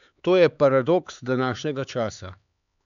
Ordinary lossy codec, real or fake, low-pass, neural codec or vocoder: none; fake; 7.2 kHz; codec, 16 kHz, 4 kbps, X-Codec, HuBERT features, trained on LibriSpeech